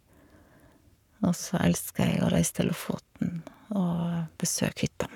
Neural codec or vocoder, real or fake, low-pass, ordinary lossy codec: codec, 44.1 kHz, 7.8 kbps, Pupu-Codec; fake; 19.8 kHz; none